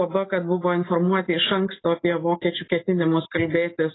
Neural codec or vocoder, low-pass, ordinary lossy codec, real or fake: none; 7.2 kHz; AAC, 16 kbps; real